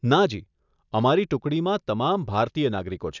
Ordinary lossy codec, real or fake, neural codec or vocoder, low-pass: none; real; none; 7.2 kHz